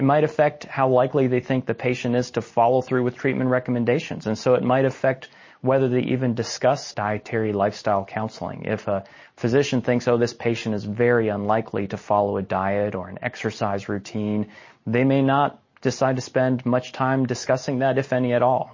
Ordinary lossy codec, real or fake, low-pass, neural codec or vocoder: MP3, 32 kbps; real; 7.2 kHz; none